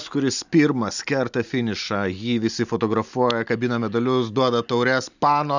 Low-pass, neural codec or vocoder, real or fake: 7.2 kHz; none; real